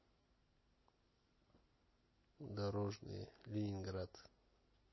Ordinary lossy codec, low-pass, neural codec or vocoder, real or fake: MP3, 24 kbps; 7.2 kHz; none; real